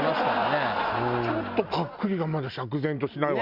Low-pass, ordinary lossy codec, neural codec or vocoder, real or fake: 5.4 kHz; Opus, 64 kbps; none; real